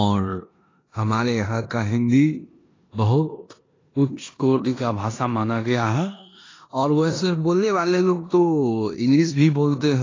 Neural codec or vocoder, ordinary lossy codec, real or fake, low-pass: codec, 16 kHz in and 24 kHz out, 0.9 kbps, LongCat-Audio-Codec, four codebook decoder; AAC, 32 kbps; fake; 7.2 kHz